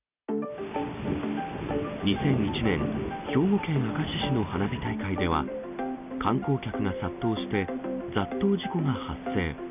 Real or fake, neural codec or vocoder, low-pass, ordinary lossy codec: real; none; 3.6 kHz; none